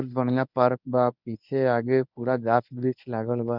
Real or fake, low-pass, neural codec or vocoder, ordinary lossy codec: fake; 5.4 kHz; codec, 24 kHz, 0.9 kbps, WavTokenizer, medium speech release version 1; none